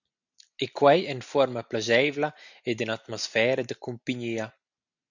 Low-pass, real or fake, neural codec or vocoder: 7.2 kHz; real; none